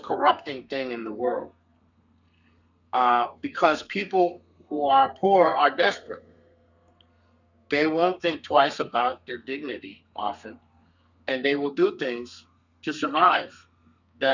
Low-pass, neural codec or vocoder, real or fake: 7.2 kHz; codec, 32 kHz, 1.9 kbps, SNAC; fake